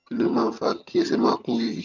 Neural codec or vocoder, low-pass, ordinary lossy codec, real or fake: vocoder, 22.05 kHz, 80 mel bands, HiFi-GAN; 7.2 kHz; none; fake